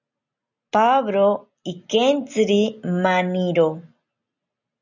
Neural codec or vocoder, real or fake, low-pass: none; real; 7.2 kHz